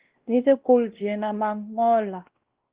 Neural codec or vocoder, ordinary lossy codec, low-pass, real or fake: codec, 16 kHz, 1 kbps, X-Codec, WavLM features, trained on Multilingual LibriSpeech; Opus, 16 kbps; 3.6 kHz; fake